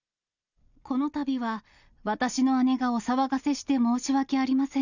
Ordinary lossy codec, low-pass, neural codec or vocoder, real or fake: none; 7.2 kHz; none; real